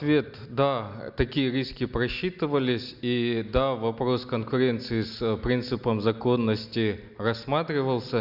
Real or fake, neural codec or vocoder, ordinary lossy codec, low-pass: real; none; none; 5.4 kHz